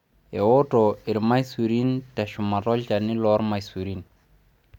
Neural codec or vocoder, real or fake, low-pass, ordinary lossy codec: none; real; 19.8 kHz; none